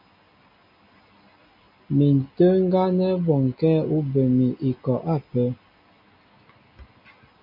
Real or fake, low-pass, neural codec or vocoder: real; 5.4 kHz; none